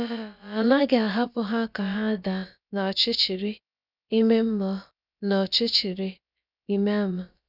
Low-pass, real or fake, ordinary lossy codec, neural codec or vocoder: 5.4 kHz; fake; none; codec, 16 kHz, about 1 kbps, DyCAST, with the encoder's durations